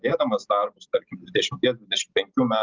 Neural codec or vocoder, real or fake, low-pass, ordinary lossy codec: none; real; 7.2 kHz; Opus, 24 kbps